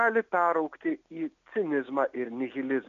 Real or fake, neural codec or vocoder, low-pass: real; none; 7.2 kHz